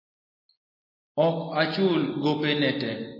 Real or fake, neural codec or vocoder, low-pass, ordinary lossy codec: real; none; 5.4 kHz; MP3, 24 kbps